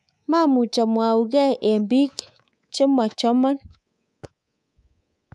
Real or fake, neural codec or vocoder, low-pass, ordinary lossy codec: fake; codec, 24 kHz, 3.1 kbps, DualCodec; none; none